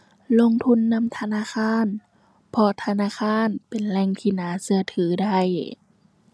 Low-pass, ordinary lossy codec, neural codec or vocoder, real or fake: none; none; none; real